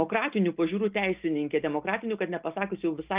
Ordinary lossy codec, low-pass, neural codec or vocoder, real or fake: Opus, 24 kbps; 3.6 kHz; none; real